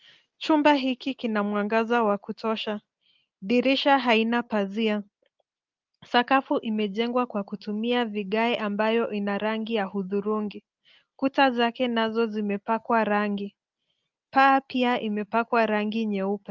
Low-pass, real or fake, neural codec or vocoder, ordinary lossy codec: 7.2 kHz; real; none; Opus, 32 kbps